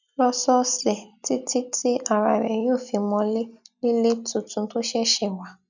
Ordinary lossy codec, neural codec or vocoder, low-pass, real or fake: none; none; 7.2 kHz; real